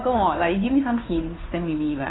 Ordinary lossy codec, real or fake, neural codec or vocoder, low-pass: AAC, 16 kbps; fake; codec, 16 kHz, 2 kbps, FunCodec, trained on Chinese and English, 25 frames a second; 7.2 kHz